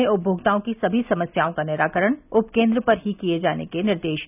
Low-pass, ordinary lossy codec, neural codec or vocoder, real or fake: 3.6 kHz; none; none; real